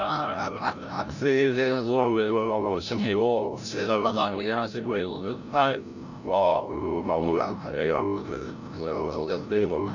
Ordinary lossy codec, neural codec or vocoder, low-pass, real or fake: none; codec, 16 kHz, 0.5 kbps, FreqCodec, larger model; 7.2 kHz; fake